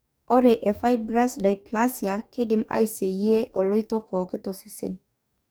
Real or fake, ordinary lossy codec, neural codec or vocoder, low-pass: fake; none; codec, 44.1 kHz, 2.6 kbps, DAC; none